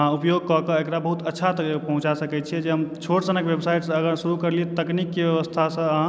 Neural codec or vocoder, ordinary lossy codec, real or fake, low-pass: none; none; real; none